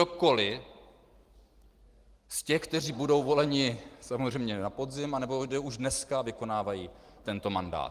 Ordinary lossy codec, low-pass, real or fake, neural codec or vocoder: Opus, 24 kbps; 14.4 kHz; real; none